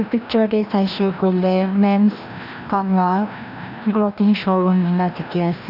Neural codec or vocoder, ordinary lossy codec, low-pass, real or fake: codec, 16 kHz, 1 kbps, FreqCodec, larger model; none; 5.4 kHz; fake